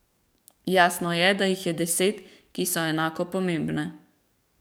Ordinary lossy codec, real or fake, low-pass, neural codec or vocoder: none; fake; none; codec, 44.1 kHz, 7.8 kbps, DAC